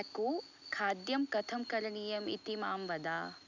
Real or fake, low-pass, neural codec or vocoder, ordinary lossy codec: real; 7.2 kHz; none; none